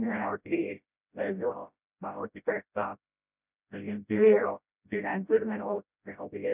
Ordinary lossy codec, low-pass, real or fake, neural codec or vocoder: none; 3.6 kHz; fake; codec, 16 kHz, 0.5 kbps, FreqCodec, smaller model